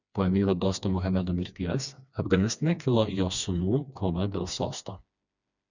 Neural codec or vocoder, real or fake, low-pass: codec, 16 kHz, 2 kbps, FreqCodec, smaller model; fake; 7.2 kHz